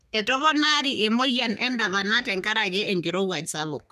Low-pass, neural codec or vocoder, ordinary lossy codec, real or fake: 14.4 kHz; codec, 32 kHz, 1.9 kbps, SNAC; none; fake